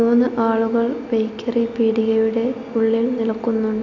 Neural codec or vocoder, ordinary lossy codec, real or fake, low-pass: none; none; real; 7.2 kHz